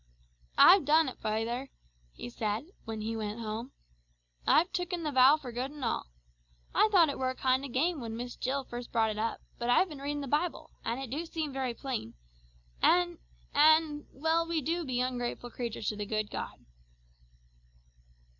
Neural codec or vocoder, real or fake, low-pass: none; real; 7.2 kHz